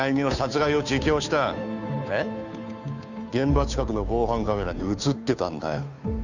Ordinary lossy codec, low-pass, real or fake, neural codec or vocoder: none; 7.2 kHz; fake; codec, 16 kHz, 2 kbps, FunCodec, trained on Chinese and English, 25 frames a second